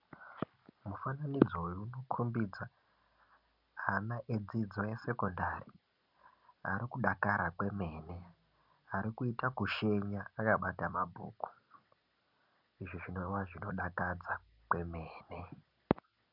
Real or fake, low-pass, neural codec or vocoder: real; 5.4 kHz; none